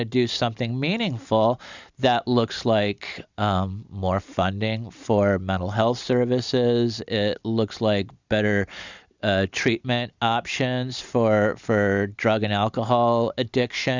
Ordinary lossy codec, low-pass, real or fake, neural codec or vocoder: Opus, 64 kbps; 7.2 kHz; real; none